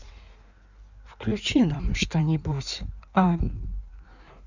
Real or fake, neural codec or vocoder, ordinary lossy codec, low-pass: fake; codec, 16 kHz in and 24 kHz out, 1.1 kbps, FireRedTTS-2 codec; none; 7.2 kHz